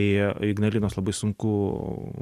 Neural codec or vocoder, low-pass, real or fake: none; 14.4 kHz; real